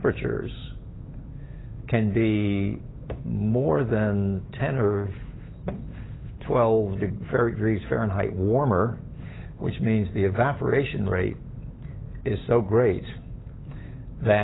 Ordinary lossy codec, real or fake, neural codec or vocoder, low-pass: AAC, 16 kbps; fake; codec, 16 kHz, 8 kbps, FunCodec, trained on Chinese and English, 25 frames a second; 7.2 kHz